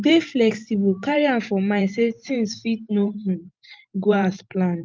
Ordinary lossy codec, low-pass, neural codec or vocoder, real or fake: Opus, 24 kbps; 7.2 kHz; vocoder, 44.1 kHz, 128 mel bands every 512 samples, BigVGAN v2; fake